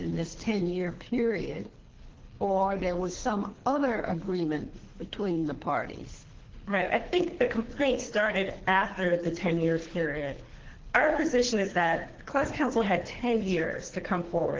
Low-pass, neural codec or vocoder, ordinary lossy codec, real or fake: 7.2 kHz; codec, 24 kHz, 3 kbps, HILCodec; Opus, 16 kbps; fake